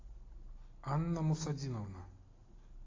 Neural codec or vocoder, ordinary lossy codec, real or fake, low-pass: none; AAC, 32 kbps; real; 7.2 kHz